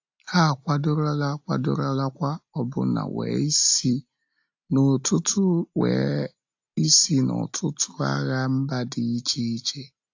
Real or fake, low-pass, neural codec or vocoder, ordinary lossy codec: real; 7.2 kHz; none; AAC, 48 kbps